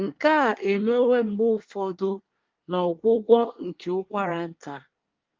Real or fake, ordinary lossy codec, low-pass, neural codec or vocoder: fake; Opus, 32 kbps; 7.2 kHz; codec, 24 kHz, 1 kbps, SNAC